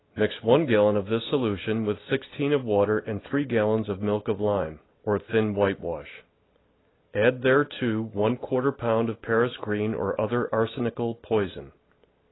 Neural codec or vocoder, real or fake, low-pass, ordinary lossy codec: none; real; 7.2 kHz; AAC, 16 kbps